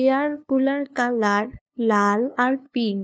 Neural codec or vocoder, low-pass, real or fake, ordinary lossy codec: codec, 16 kHz, 2 kbps, FunCodec, trained on LibriTTS, 25 frames a second; none; fake; none